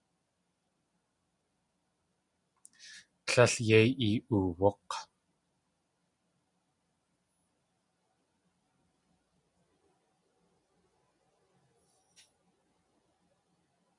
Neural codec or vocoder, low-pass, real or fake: none; 10.8 kHz; real